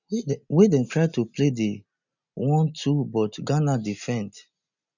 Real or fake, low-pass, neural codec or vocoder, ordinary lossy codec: real; 7.2 kHz; none; none